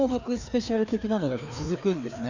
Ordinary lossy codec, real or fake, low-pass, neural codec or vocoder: none; fake; 7.2 kHz; codec, 16 kHz, 2 kbps, FreqCodec, larger model